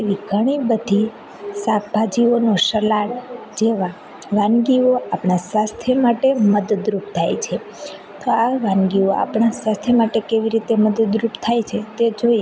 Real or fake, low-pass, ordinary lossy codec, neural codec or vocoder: real; none; none; none